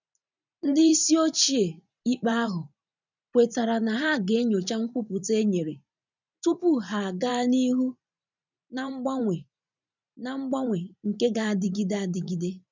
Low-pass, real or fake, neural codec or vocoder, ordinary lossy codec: 7.2 kHz; fake; vocoder, 44.1 kHz, 128 mel bands every 512 samples, BigVGAN v2; none